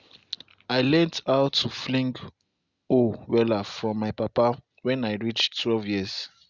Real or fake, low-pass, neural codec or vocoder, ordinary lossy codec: real; 7.2 kHz; none; Opus, 64 kbps